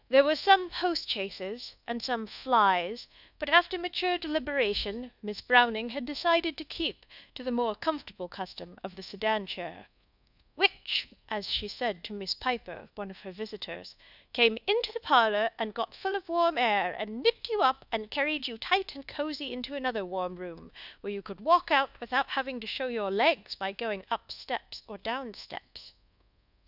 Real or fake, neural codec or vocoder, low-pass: fake; codec, 24 kHz, 1.2 kbps, DualCodec; 5.4 kHz